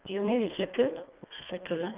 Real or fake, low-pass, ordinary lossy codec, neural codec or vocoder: fake; 3.6 kHz; Opus, 24 kbps; codec, 24 kHz, 1.5 kbps, HILCodec